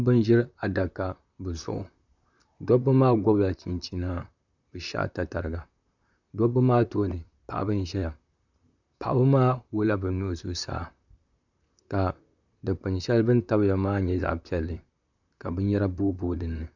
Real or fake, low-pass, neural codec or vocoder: fake; 7.2 kHz; vocoder, 44.1 kHz, 128 mel bands, Pupu-Vocoder